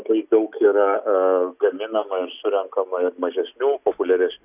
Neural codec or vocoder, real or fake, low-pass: none; real; 3.6 kHz